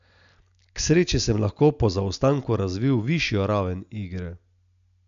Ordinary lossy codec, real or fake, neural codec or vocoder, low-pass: none; real; none; 7.2 kHz